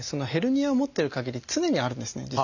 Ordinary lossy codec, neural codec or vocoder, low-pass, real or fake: none; none; 7.2 kHz; real